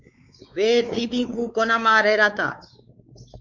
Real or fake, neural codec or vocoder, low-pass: fake; codec, 16 kHz, 4 kbps, X-Codec, WavLM features, trained on Multilingual LibriSpeech; 7.2 kHz